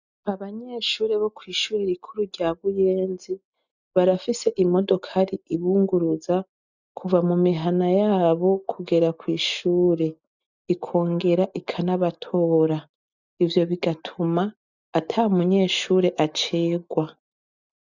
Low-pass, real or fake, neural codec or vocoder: 7.2 kHz; real; none